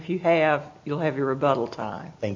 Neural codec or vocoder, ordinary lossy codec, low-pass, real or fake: none; AAC, 48 kbps; 7.2 kHz; real